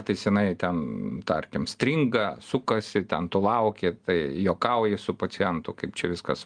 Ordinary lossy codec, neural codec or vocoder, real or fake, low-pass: Opus, 32 kbps; none; real; 9.9 kHz